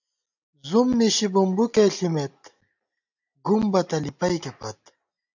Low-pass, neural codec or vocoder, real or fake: 7.2 kHz; none; real